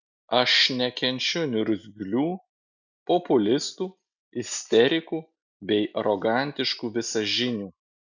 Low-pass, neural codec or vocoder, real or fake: 7.2 kHz; none; real